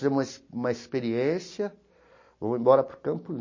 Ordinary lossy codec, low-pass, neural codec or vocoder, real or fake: MP3, 32 kbps; 7.2 kHz; none; real